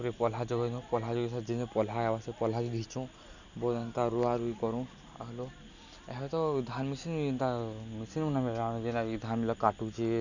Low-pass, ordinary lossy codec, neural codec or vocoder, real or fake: 7.2 kHz; none; none; real